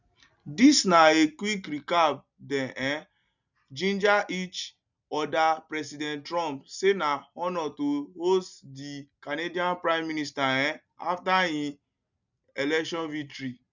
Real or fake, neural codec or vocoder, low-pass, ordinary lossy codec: real; none; 7.2 kHz; none